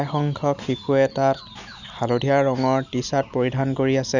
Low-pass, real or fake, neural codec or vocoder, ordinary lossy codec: 7.2 kHz; real; none; none